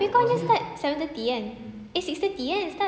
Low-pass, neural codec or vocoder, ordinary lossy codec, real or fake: none; none; none; real